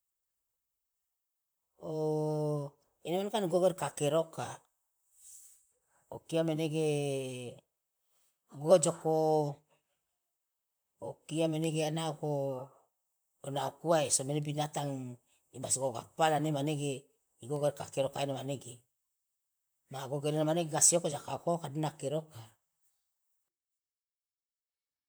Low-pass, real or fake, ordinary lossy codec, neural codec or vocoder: none; fake; none; vocoder, 44.1 kHz, 128 mel bands, Pupu-Vocoder